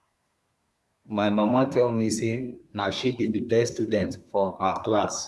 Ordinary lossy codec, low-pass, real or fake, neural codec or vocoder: none; none; fake; codec, 24 kHz, 1 kbps, SNAC